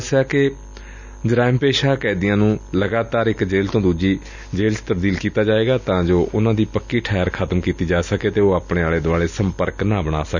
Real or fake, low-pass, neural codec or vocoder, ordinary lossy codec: real; 7.2 kHz; none; none